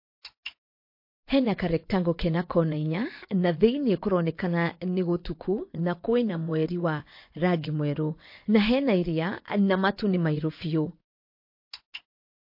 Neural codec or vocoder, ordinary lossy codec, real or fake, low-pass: vocoder, 24 kHz, 100 mel bands, Vocos; MP3, 32 kbps; fake; 5.4 kHz